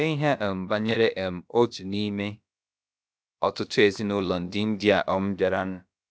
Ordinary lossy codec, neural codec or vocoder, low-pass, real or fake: none; codec, 16 kHz, about 1 kbps, DyCAST, with the encoder's durations; none; fake